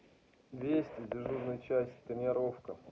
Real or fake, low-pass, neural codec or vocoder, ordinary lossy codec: real; none; none; none